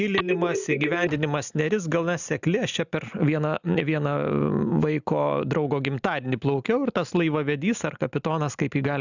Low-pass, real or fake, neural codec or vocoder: 7.2 kHz; real; none